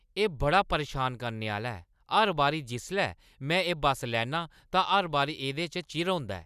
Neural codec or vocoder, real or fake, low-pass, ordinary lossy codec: none; real; 14.4 kHz; none